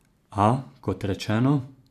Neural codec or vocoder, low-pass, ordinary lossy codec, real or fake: vocoder, 44.1 kHz, 128 mel bands every 512 samples, BigVGAN v2; 14.4 kHz; none; fake